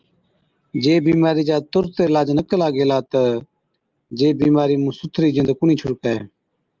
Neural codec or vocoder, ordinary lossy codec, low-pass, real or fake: none; Opus, 32 kbps; 7.2 kHz; real